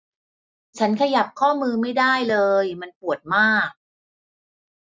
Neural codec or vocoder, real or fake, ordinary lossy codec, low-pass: none; real; none; none